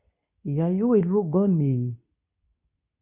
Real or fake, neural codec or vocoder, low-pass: fake; codec, 24 kHz, 0.9 kbps, WavTokenizer, medium speech release version 2; 3.6 kHz